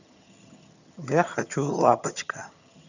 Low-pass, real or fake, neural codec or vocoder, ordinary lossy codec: 7.2 kHz; fake; vocoder, 22.05 kHz, 80 mel bands, HiFi-GAN; none